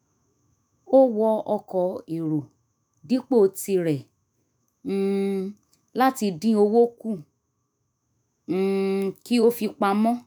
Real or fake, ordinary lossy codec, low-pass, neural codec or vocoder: fake; none; none; autoencoder, 48 kHz, 128 numbers a frame, DAC-VAE, trained on Japanese speech